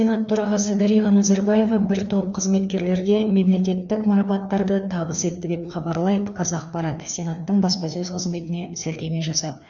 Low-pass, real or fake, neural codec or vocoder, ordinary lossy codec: 7.2 kHz; fake; codec, 16 kHz, 2 kbps, FreqCodec, larger model; none